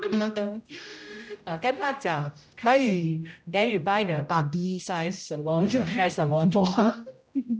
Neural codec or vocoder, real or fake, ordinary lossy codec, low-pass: codec, 16 kHz, 0.5 kbps, X-Codec, HuBERT features, trained on general audio; fake; none; none